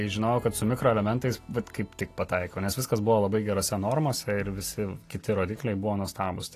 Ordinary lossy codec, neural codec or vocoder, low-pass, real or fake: AAC, 48 kbps; none; 14.4 kHz; real